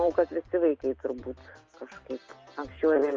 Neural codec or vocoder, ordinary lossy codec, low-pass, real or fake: none; Opus, 24 kbps; 7.2 kHz; real